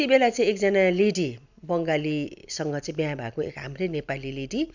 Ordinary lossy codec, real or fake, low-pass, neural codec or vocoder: none; real; 7.2 kHz; none